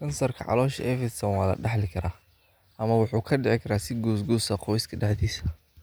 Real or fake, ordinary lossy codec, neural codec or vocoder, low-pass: real; none; none; none